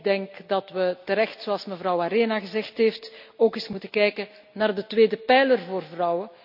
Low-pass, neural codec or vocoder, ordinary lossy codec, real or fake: 5.4 kHz; none; AAC, 48 kbps; real